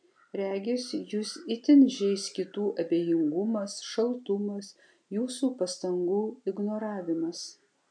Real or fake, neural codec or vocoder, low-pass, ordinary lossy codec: real; none; 9.9 kHz; MP3, 64 kbps